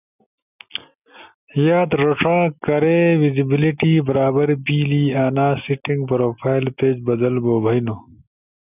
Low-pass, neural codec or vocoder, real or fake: 3.6 kHz; none; real